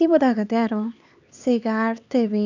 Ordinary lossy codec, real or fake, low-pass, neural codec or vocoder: none; fake; 7.2 kHz; codec, 16 kHz, 2 kbps, X-Codec, HuBERT features, trained on LibriSpeech